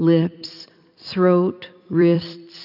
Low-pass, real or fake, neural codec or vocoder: 5.4 kHz; fake; vocoder, 44.1 kHz, 128 mel bands every 256 samples, BigVGAN v2